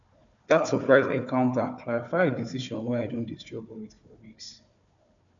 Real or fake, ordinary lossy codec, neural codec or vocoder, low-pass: fake; MP3, 96 kbps; codec, 16 kHz, 4 kbps, FunCodec, trained on Chinese and English, 50 frames a second; 7.2 kHz